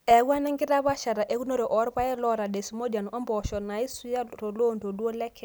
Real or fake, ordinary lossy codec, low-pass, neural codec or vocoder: real; none; none; none